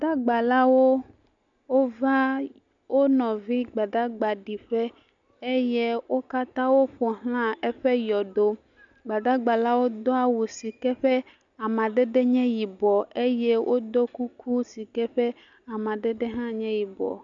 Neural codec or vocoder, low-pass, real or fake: none; 7.2 kHz; real